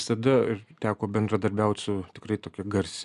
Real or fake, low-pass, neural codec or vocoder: real; 10.8 kHz; none